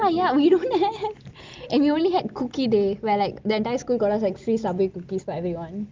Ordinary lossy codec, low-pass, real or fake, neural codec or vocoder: Opus, 32 kbps; 7.2 kHz; real; none